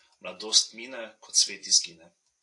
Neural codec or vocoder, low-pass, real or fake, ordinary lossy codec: vocoder, 44.1 kHz, 128 mel bands every 256 samples, BigVGAN v2; 10.8 kHz; fake; AAC, 48 kbps